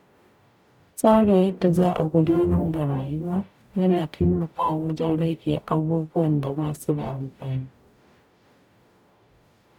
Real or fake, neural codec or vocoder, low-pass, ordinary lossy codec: fake; codec, 44.1 kHz, 0.9 kbps, DAC; 19.8 kHz; none